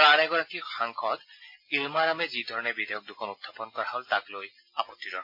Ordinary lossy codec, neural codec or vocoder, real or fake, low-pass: MP3, 32 kbps; none; real; 5.4 kHz